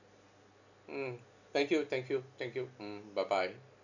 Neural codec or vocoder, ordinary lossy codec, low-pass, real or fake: none; none; 7.2 kHz; real